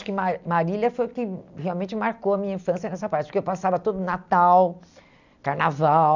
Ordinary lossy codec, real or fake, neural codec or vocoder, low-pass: none; real; none; 7.2 kHz